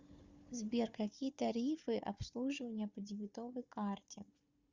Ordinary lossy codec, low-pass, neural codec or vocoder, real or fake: Opus, 64 kbps; 7.2 kHz; codec, 16 kHz, 4 kbps, FunCodec, trained on Chinese and English, 50 frames a second; fake